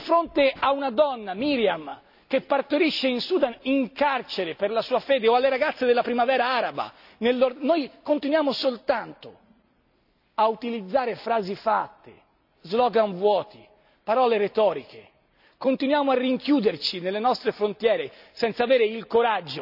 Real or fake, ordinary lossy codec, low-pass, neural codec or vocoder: real; none; 5.4 kHz; none